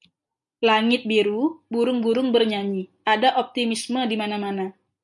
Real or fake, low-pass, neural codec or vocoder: real; 10.8 kHz; none